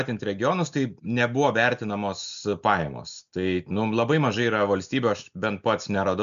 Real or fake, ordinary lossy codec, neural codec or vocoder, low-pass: real; MP3, 96 kbps; none; 7.2 kHz